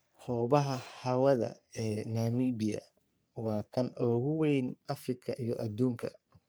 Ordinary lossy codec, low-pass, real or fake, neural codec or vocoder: none; none; fake; codec, 44.1 kHz, 3.4 kbps, Pupu-Codec